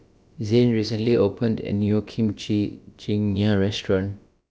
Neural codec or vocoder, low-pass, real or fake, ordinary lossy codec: codec, 16 kHz, about 1 kbps, DyCAST, with the encoder's durations; none; fake; none